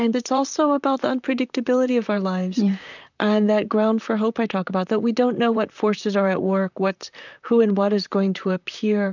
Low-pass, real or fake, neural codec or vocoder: 7.2 kHz; fake; vocoder, 44.1 kHz, 128 mel bands, Pupu-Vocoder